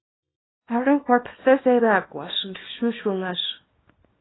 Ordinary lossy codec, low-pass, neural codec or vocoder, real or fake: AAC, 16 kbps; 7.2 kHz; codec, 24 kHz, 0.9 kbps, WavTokenizer, small release; fake